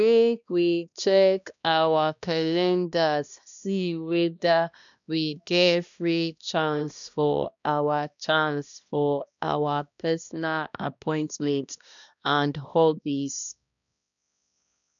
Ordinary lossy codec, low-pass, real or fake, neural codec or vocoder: none; 7.2 kHz; fake; codec, 16 kHz, 1 kbps, X-Codec, HuBERT features, trained on balanced general audio